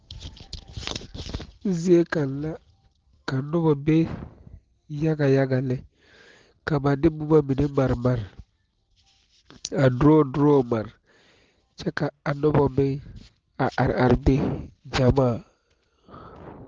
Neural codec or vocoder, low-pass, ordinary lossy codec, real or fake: none; 7.2 kHz; Opus, 16 kbps; real